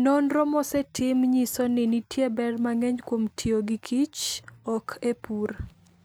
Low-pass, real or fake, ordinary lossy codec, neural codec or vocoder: none; real; none; none